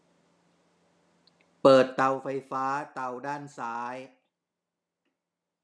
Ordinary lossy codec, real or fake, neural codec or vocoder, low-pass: none; real; none; none